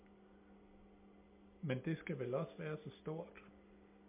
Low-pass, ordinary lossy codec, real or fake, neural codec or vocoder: 3.6 kHz; MP3, 32 kbps; real; none